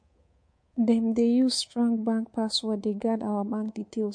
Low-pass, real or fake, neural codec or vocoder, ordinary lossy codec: 9.9 kHz; fake; codec, 24 kHz, 3.1 kbps, DualCodec; MP3, 48 kbps